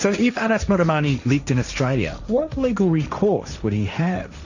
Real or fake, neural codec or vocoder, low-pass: fake; codec, 16 kHz, 1.1 kbps, Voila-Tokenizer; 7.2 kHz